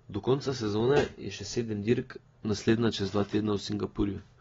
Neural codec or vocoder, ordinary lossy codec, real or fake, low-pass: none; AAC, 24 kbps; real; 7.2 kHz